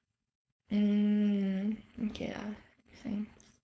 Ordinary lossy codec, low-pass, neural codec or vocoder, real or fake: none; none; codec, 16 kHz, 4.8 kbps, FACodec; fake